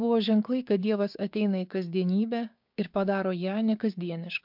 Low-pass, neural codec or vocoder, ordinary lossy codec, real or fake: 5.4 kHz; codec, 16 kHz, 6 kbps, DAC; MP3, 48 kbps; fake